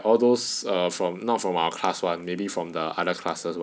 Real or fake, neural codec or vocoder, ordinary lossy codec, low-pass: real; none; none; none